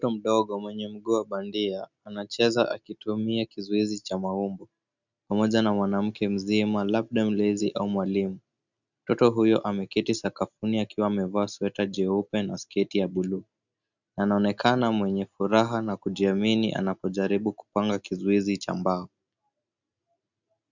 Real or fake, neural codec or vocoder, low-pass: real; none; 7.2 kHz